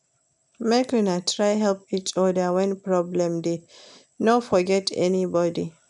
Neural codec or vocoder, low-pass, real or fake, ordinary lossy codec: none; 10.8 kHz; real; none